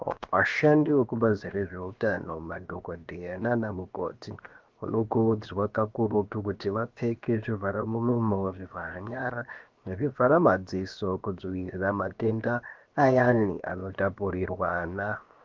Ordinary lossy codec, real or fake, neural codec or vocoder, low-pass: Opus, 24 kbps; fake; codec, 16 kHz, 0.7 kbps, FocalCodec; 7.2 kHz